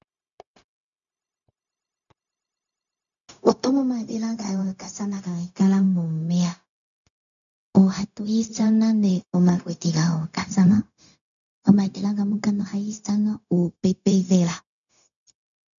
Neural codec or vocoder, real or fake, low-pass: codec, 16 kHz, 0.4 kbps, LongCat-Audio-Codec; fake; 7.2 kHz